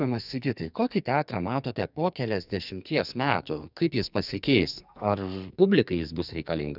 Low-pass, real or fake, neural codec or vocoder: 5.4 kHz; fake; codec, 44.1 kHz, 2.6 kbps, SNAC